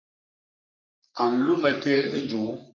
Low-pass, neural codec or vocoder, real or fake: 7.2 kHz; codec, 44.1 kHz, 3.4 kbps, Pupu-Codec; fake